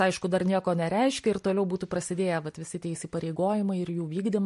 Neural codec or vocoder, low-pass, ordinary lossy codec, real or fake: none; 14.4 kHz; MP3, 48 kbps; real